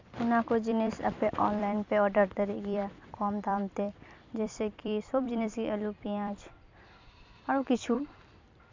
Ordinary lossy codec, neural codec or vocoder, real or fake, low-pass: MP3, 64 kbps; vocoder, 44.1 kHz, 128 mel bands every 256 samples, BigVGAN v2; fake; 7.2 kHz